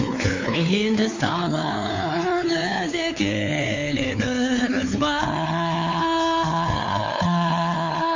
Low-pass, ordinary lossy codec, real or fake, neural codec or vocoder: 7.2 kHz; MP3, 64 kbps; fake; codec, 16 kHz, 4 kbps, X-Codec, WavLM features, trained on Multilingual LibriSpeech